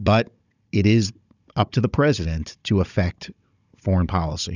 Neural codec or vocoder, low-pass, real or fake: codec, 16 kHz, 16 kbps, FunCodec, trained on Chinese and English, 50 frames a second; 7.2 kHz; fake